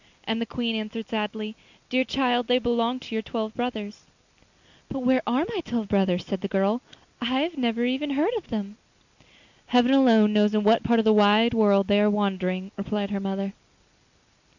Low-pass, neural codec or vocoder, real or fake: 7.2 kHz; none; real